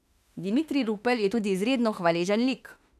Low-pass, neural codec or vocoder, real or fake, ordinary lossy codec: 14.4 kHz; autoencoder, 48 kHz, 32 numbers a frame, DAC-VAE, trained on Japanese speech; fake; none